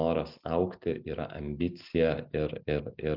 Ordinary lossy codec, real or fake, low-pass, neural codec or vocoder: Opus, 24 kbps; real; 5.4 kHz; none